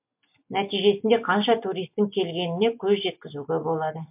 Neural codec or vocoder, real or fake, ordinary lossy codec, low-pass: none; real; MP3, 32 kbps; 3.6 kHz